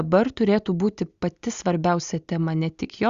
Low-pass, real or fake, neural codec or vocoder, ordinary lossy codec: 7.2 kHz; real; none; Opus, 64 kbps